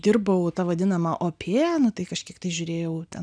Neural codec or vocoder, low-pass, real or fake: none; 9.9 kHz; real